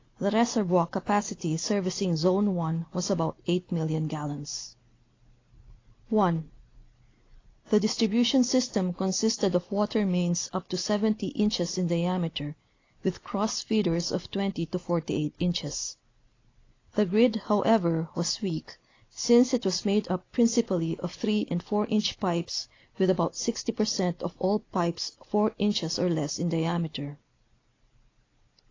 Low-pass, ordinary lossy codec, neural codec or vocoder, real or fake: 7.2 kHz; AAC, 32 kbps; none; real